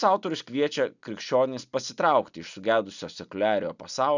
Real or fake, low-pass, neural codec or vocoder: real; 7.2 kHz; none